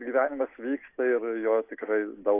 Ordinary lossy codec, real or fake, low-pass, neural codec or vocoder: AAC, 24 kbps; real; 3.6 kHz; none